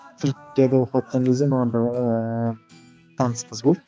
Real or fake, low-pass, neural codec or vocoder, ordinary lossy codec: fake; none; codec, 16 kHz, 2 kbps, X-Codec, HuBERT features, trained on balanced general audio; none